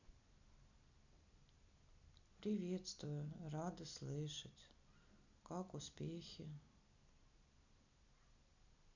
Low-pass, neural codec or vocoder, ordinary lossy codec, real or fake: 7.2 kHz; none; none; real